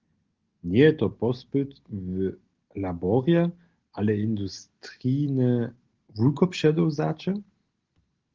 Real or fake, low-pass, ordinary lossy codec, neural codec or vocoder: real; 7.2 kHz; Opus, 16 kbps; none